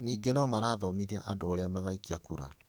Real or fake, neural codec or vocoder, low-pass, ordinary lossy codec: fake; codec, 44.1 kHz, 2.6 kbps, SNAC; none; none